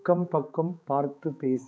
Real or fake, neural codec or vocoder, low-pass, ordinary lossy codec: fake; codec, 16 kHz, 4 kbps, X-Codec, HuBERT features, trained on balanced general audio; none; none